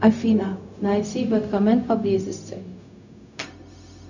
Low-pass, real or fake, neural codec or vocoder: 7.2 kHz; fake; codec, 16 kHz, 0.4 kbps, LongCat-Audio-Codec